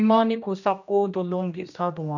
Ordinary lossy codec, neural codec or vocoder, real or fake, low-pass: none; codec, 16 kHz, 1 kbps, X-Codec, HuBERT features, trained on general audio; fake; 7.2 kHz